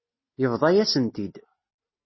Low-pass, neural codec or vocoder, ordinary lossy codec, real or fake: 7.2 kHz; none; MP3, 24 kbps; real